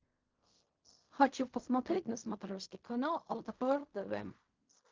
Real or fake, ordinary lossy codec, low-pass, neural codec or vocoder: fake; Opus, 32 kbps; 7.2 kHz; codec, 16 kHz in and 24 kHz out, 0.4 kbps, LongCat-Audio-Codec, fine tuned four codebook decoder